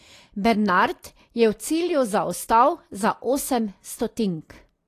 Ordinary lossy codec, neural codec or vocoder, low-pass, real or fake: AAC, 48 kbps; none; 14.4 kHz; real